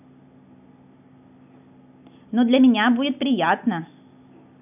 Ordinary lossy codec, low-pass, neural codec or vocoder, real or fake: none; 3.6 kHz; none; real